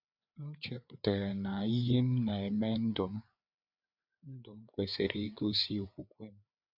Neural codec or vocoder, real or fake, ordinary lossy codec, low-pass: codec, 16 kHz, 4 kbps, FreqCodec, larger model; fake; none; 5.4 kHz